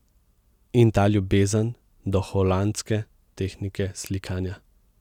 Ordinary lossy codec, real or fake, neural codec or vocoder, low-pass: none; real; none; 19.8 kHz